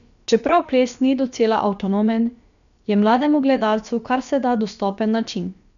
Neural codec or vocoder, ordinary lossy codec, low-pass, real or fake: codec, 16 kHz, about 1 kbps, DyCAST, with the encoder's durations; none; 7.2 kHz; fake